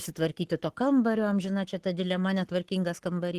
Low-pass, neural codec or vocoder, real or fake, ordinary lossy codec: 14.4 kHz; codec, 44.1 kHz, 7.8 kbps, Pupu-Codec; fake; Opus, 16 kbps